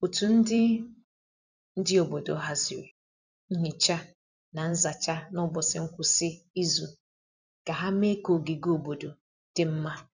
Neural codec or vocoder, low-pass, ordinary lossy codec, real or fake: vocoder, 44.1 kHz, 128 mel bands every 256 samples, BigVGAN v2; 7.2 kHz; none; fake